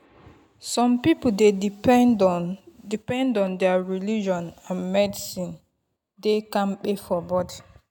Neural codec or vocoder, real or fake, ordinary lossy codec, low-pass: none; real; none; 19.8 kHz